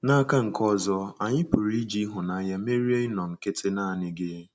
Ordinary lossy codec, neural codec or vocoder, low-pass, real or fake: none; none; none; real